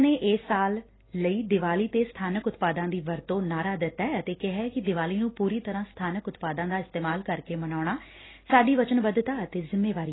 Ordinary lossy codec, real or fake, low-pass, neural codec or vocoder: AAC, 16 kbps; real; 7.2 kHz; none